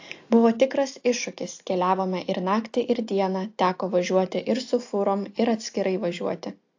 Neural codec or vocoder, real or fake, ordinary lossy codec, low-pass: none; real; AAC, 48 kbps; 7.2 kHz